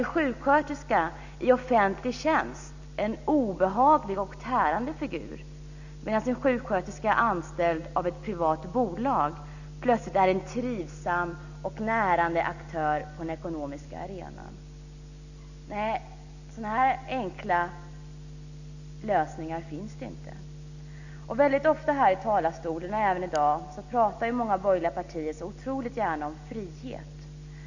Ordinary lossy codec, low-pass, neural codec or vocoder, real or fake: none; 7.2 kHz; none; real